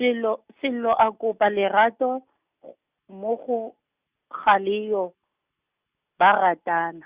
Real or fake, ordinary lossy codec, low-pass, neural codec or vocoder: real; Opus, 64 kbps; 3.6 kHz; none